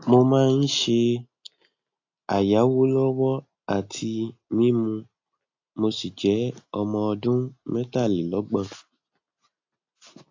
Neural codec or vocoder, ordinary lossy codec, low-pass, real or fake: none; AAC, 48 kbps; 7.2 kHz; real